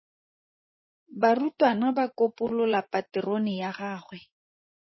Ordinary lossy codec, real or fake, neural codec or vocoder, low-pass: MP3, 24 kbps; fake; vocoder, 24 kHz, 100 mel bands, Vocos; 7.2 kHz